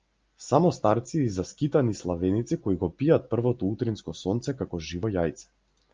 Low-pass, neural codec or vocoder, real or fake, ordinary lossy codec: 7.2 kHz; none; real; Opus, 24 kbps